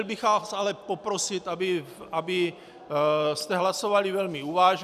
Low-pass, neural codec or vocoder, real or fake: 14.4 kHz; none; real